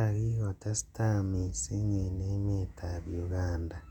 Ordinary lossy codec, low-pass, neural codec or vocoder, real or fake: none; 19.8 kHz; vocoder, 48 kHz, 128 mel bands, Vocos; fake